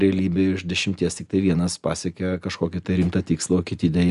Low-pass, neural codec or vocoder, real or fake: 10.8 kHz; none; real